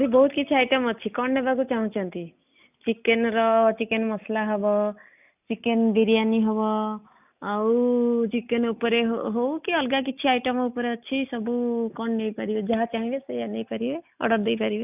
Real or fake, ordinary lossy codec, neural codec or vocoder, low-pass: real; none; none; 3.6 kHz